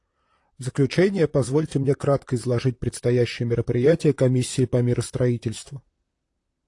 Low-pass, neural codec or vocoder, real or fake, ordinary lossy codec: 10.8 kHz; vocoder, 44.1 kHz, 128 mel bands, Pupu-Vocoder; fake; AAC, 48 kbps